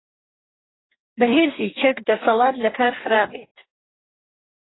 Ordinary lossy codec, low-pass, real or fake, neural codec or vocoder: AAC, 16 kbps; 7.2 kHz; fake; codec, 44.1 kHz, 2.6 kbps, DAC